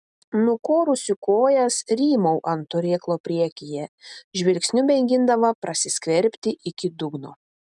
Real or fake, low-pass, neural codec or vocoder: real; 10.8 kHz; none